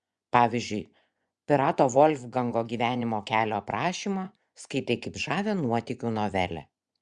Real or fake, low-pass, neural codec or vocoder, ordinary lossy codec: fake; 10.8 kHz; vocoder, 24 kHz, 100 mel bands, Vocos; Opus, 64 kbps